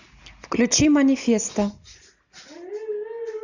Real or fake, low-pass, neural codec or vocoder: real; 7.2 kHz; none